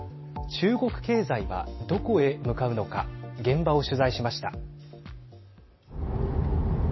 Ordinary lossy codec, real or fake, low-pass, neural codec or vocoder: MP3, 24 kbps; real; 7.2 kHz; none